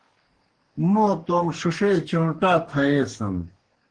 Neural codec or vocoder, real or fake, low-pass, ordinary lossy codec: codec, 44.1 kHz, 2.6 kbps, DAC; fake; 9.9 kHz; Opus, 16 kbps